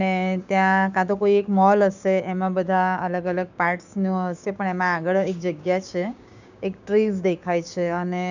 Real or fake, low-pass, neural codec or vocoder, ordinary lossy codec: fake; 7.2 kHz; codec, 16 kHz, 6 kbps, DAC; none